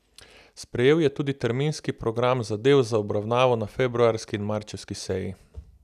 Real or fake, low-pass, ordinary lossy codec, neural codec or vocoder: real; 14.4 kHz; none; none